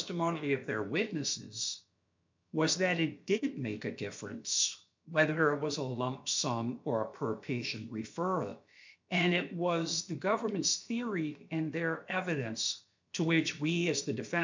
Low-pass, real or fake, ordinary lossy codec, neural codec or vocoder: 7.2 kHz; fake; MP3, 64 kbps; codec, 16 kHz, about 1 kbps, DyCAST, with the encoder's durations